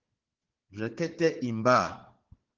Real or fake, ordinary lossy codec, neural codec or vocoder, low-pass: fake; Opus, 16 kbps; codec, 16 kHz, 4 kbps, FunCodec, trained on Chinese and English, 50 frames a second; 7.2 kHz